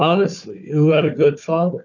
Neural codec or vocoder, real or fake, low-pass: codec, 16 kHz, 4 kbps, FunCodec, trained on Chinese and English, 50 frames a second; fake; 7.2 kHz